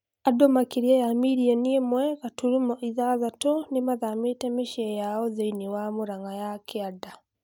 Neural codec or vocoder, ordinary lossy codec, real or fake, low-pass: none; none; real; 19.8 kHz